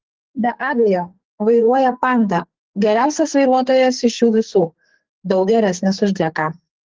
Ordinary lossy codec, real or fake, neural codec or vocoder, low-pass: Opus, 16 kbps; fake; codec, 44.1 kHz, 2.6 kbps, SNAC; 7.2 kHz